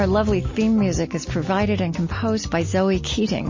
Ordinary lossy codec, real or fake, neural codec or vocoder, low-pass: MP3, 32 kbps; real; none; 7.2 kHz